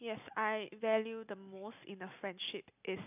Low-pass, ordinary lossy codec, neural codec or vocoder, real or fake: 3.6 kHz; none; none; real